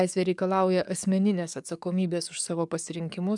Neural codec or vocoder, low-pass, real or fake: codec, 44.1 kHz, 7.8 kbps, DAC; 10.8 kHz; fake